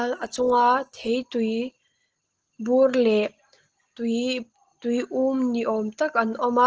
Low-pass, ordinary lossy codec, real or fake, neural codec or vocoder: 7.2 kHz; Opus, 16 kbps; real; none